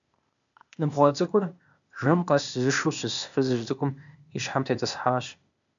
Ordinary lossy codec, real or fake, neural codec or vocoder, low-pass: MP3, 96 kbps; fake; codec, 16 kHz, 0.8 kbps, ZipCodec; 7.2 kHz